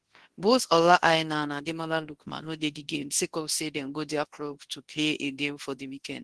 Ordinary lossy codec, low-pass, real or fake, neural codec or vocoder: Opus, 16 kbps; 10.8 kHz; fake; codec, 24 kHz, 0.9 kbps, WavTokenizer, large speech release